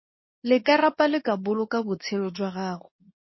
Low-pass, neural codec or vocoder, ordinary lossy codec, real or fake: 7.2 kHz; codec, 24 kHz, 0.9 kbps, WavTokenizer, medium speech release version 2; MP3, 24 kbps; fake